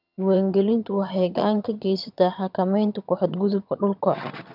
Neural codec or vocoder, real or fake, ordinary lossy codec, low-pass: vocoder, 22.05 kHz, 80 mel bands, HiFi-GAN; fake; none; 5.4 kHz